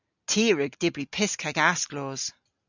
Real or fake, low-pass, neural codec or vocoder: real; 7.2 kHz; none